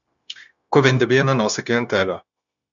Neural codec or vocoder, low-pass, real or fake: codec, 16 kHz, 0.9 kbps, LongCat-Audio-Codec; 7.2 kHz; fake